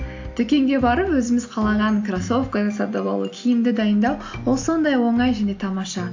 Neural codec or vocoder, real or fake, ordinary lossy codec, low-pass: none; real; AAC, 48 kbps; 7.2 kHz